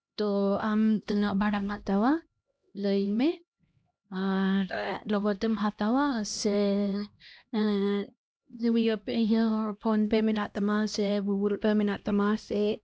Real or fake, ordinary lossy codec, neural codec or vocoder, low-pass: fake; none; codec, 16 kHz, 1 kbps, X-Codec, HuBERT features, trained on LibriSpeech; none